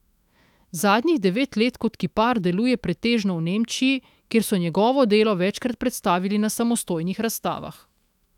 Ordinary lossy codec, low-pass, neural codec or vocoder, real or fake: none; 19.8 kHz; autoencoder, 48 kHz, 128 numbers a frame, DAC-VAE, trained on Japanese speech; fake